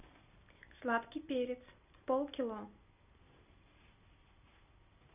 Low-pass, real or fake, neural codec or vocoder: 3.6 kHz; real; none